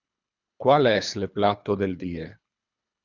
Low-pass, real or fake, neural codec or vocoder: 7.2 kHz; fake; codec, 24 kHz, 3 kbps, HILCodec